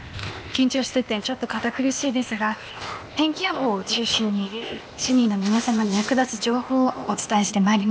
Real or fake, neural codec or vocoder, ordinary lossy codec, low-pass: fake; codec, 16 kHz, 0.8 kbps, ZipCodec; none; none